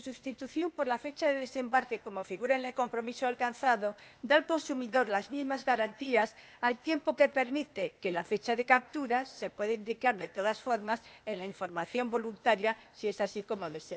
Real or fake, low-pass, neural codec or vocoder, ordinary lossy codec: fake; none; codec, 16 kHz, 0.8 kbps, ZipCodec; none